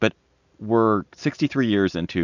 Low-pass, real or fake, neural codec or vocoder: 7.2 kHz; real; none